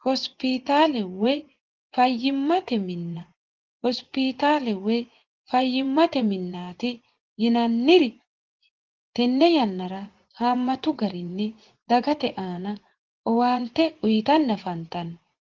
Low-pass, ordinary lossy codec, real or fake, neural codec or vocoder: 7.2 kHz; Opus, 16 kbps; fake; vocoder, 24 kHz, 100 mel bands, Vocos